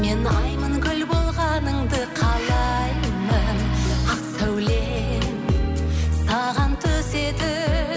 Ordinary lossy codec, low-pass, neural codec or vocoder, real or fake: none; none; none; real